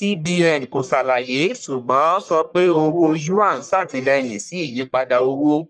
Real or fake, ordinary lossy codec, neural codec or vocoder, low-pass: fake; none; codec, 44.1 kHz, 1.7 kbps, Pupu-Codec; 9.9 kHz